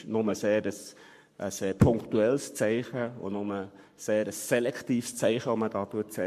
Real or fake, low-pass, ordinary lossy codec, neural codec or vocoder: fake; 14.4 kHz; MP3, 64 kbps; codec, 44.1 kHz, 7.8 kbps, Pupu-Codec